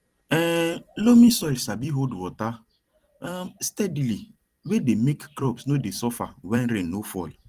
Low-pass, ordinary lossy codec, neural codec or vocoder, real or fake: 14.4 kHz; Opus, 24 kbps; vocoder, 44.1 kHz, 128 mel bands every 256 samples, BigVGAN v2; fake